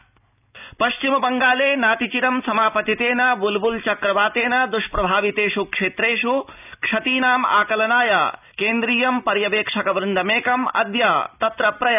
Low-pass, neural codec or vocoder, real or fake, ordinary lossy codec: 3.6 kHz; none; real; none